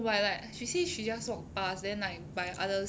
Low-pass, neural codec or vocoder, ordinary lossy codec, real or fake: none; none; none; real